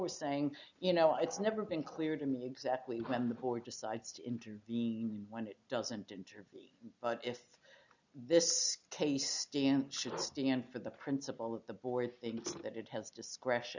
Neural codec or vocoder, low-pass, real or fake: none; 7.2 kHz; real